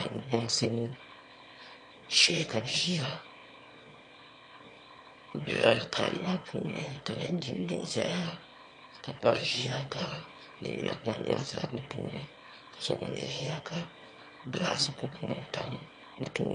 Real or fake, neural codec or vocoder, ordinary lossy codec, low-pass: fake; autoencoder, 22.05 kHz, a latent of 192 numbers a frame, VITS, trained on one speaker; MP3, 48 kbps; 9.9 kHz